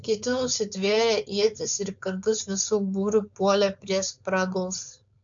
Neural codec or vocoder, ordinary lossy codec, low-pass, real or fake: codec, 16 kHz, 4.8 kbps, FACodec; MP3, 64 kbps; 7.2 kHz; fake